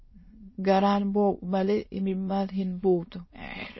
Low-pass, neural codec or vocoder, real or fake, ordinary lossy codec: 7.2 kHz; autoencoder, 22.05 kHz, a latent of 192 numbers a frame, VITS, trained on many speakers; fake; MP3, 24 kbps